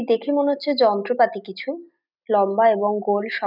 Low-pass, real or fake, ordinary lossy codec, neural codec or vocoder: 5.4 kHz; real; none; none